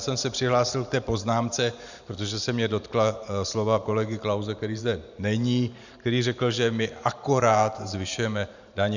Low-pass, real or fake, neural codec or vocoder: 7.2 kHz; fake; vocoder, 44.1 kHz, 128 mel bands every 512 samples, BigVGAN v2